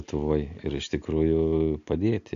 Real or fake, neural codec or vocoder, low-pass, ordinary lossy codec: real; none; 7.2 kHz; AAC, 64 kbps